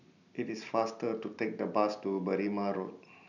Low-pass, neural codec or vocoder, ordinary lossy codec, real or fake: 7.2 kHz; none; none; real